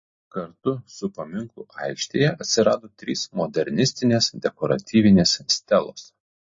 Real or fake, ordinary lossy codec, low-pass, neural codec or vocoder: real; MP3, 32 kbps; 7.2 kHz; none